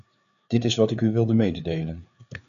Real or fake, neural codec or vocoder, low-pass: fake; codec, 16 kHz, 4 kbps, FreqCodec, larger model; 7.2 kHz